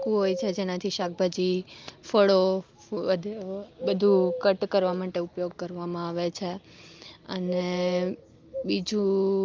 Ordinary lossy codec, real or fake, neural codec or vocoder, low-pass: Opus, 32 kbps; real; none; 7.2 kHz